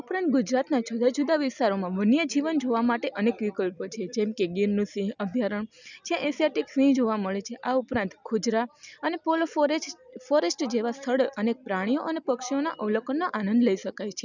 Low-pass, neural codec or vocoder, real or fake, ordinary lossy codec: 7.2 kHz; none; real; none